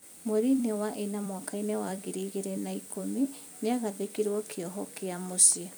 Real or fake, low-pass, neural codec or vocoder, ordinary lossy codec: fake; none; vocoder, 44.1 kHz, 128 mel bands every 256 samples, BigVGAN v2; none